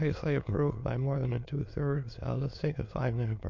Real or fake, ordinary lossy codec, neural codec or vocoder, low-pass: fake; MP3, 64 kbps; autoencoder, 22.05 kHz, a latent of 192 numbers a frame, VITS, trained on many speakers; 7.2 kHz